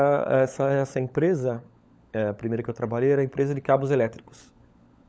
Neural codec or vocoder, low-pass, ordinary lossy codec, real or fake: codec, 16 kHz, 16 kbps, FunCodec, trained on LibriTTS, 50 frames a second; none; none; fake